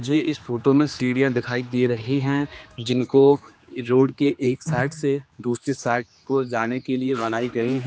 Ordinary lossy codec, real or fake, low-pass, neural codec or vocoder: none; fake; none; codec, 16 kHz, 2 kbps, X-Codec, HuBERT features, trained on general audio